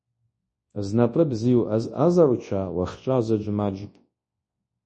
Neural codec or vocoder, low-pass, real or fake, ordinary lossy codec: codec, 24 kHz, 0.9 kbps, WavTokenizer, large speech release; 10.8 kHz; fake; MP3, 32 kbps